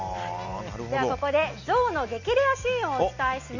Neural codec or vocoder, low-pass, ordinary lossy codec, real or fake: none; 7.2 kHz; none; real